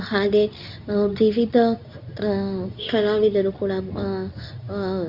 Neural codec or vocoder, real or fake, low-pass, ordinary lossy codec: codec, 24 kHz, 0.9 kbps, WavTokenizer, medium speech release version 2; fake; 5.4 kHz; none